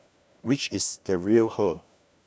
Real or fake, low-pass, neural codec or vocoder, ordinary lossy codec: fake; none; codec, 16 kHz, 1 kbps, FunCodec, trained on LibriTTS, 50 frames a second; none